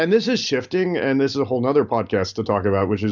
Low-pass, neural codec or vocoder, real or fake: 7.2 kHz; none; real